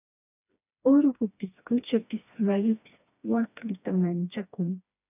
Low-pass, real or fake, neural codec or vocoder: 3.6 kHz; fake; codec, 16 kHz, 2 kbps, FreqCodec, smaller model